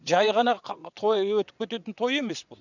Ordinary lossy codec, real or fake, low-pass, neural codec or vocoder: none; fake; 7.2 kHz; vocoder, 22.05 kHz, 80 mel bands, WaveNeXt